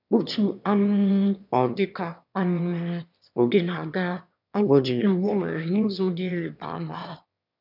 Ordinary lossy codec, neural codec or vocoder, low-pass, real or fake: none; autoencoder, 22.05 kHz, a latent of 192 numbers a frame, VITS, trained on one speaker; 5.4 kHz; fake